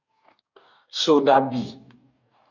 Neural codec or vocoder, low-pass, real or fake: codec, 44.1 kHz, 2.6 kbps, DAC; 7.2 kHz; fake